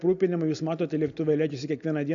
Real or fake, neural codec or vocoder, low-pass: real; none; 7.2 kHz